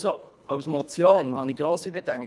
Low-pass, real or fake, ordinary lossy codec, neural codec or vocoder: none; fake; none; codec, 24 kHz, 1.5 kbps, HILCodec